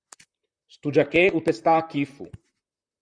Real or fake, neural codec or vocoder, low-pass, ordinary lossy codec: fake; vocoder, 44.1 kHz, 128 mel bands, Pupu-Vocoder; 9.9 kHz; Opus, 64 kbps